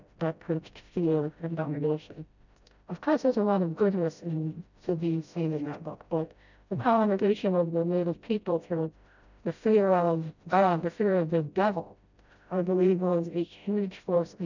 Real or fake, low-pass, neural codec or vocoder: fake; 7.2 kHz; codec, 16 kHz, 0.5 kbps, FreqCodec, smaller model